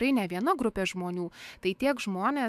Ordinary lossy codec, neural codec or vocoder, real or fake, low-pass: AAC, 96 kbps; autoencoder, 48 kHz, 128 numbers a frame, DAC-VAE, trained on Japanese speech; fake; 14.4 kHz